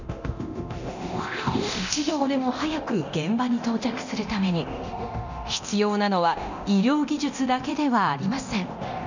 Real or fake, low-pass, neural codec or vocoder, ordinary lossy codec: fake; 7.2 kHz; codec, 24 kHz, 0.9 kbps, DualCodec; none